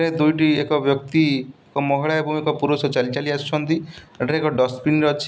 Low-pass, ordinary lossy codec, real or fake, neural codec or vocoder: none; none; real; none